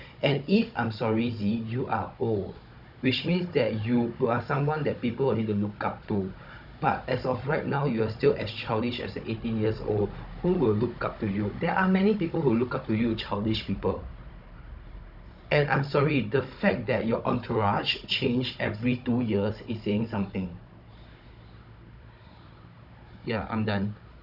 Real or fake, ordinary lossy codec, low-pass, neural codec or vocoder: fake; none; 5.4 kHz; codec, 16 kHz, 16 kbps, FunCodec, trained on Chinese and English, 50 frames a second